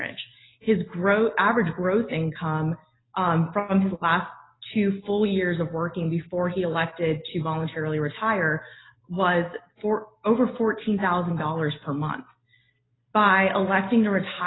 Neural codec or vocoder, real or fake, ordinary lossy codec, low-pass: none; real; AAC, 16 kbps; 7.2 kHz